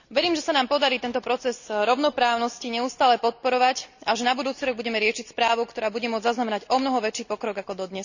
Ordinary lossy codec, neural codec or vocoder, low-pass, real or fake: none; none; 7.2 kHz; real